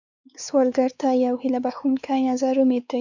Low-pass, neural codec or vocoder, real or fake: 7.2 kHz; codec, 16 kHz, 4 kbps, X-Codec, WavLM features, trained on Multilingual LibriSpeech; fake